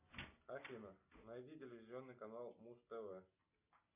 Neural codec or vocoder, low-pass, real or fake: none; 3.6 kHz; real